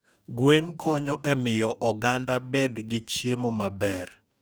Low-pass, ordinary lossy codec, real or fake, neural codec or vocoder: none; none; fake; codec, 44.1 kHz, 2.6 kbps, DAC